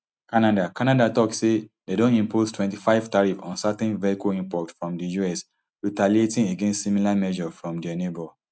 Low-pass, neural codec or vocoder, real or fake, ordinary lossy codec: none; none; real; none